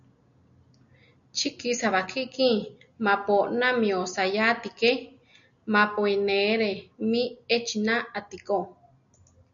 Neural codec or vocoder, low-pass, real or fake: none; 7.2 kHz; real